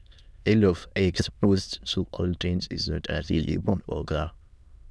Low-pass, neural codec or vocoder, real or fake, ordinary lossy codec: none; autoencoder, 22.05 kHz, a latent of 192 numbers a frame, VITS, trained on many speakers; fake; none